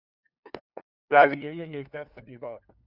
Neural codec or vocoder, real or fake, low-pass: codec, 16 kHz, 2 kbps, FunCodec, trained on LibriTTS, 25 frames a second; fake; 5.4 kHz